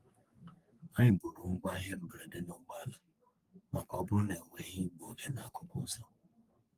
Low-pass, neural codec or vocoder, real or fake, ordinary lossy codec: 14.4 kHz; codec, 44.1 kHz, 3.4 kbps, Pupu-Codec; fake; Opus, 32 kbps